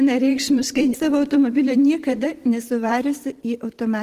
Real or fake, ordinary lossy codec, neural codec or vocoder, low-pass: fake; Opus, 16 kbps; vocoder, 44.1 kHz, 128 mel bands every 512 samples, BigVGAN v2; 14.4 kHz